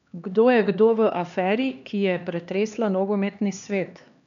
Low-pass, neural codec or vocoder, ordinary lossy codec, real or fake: 7.2 kHz; codec, 16 kHz, 2 kbps, X-Codec, HuBERT features, trained on LibriSpeech; none; fake